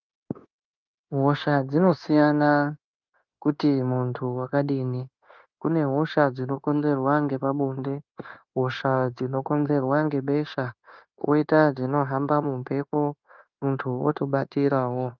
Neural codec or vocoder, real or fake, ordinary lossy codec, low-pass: codec, 16 kHz, 0.9 kbps, LongCat-Audio-Codec; fake; Opus, 24 kbps; 7.2 kHz